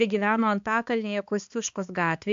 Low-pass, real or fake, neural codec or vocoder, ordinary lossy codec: 7.2 kHz; fake; codec, 16 kHz, 2 kbps, X-Codec, HuBERT features, trained on balanced general audio; AAC, 64 kbps